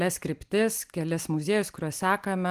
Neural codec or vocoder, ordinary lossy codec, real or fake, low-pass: none; Opus, 24 kbps; real; 14.4 kHz